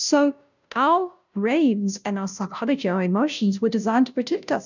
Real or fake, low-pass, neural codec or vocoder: fake; 7.2 kHz; codec, 16 kHz, 0.5 kbps, FunCodec, trained on Chinese and English, 25 frames a second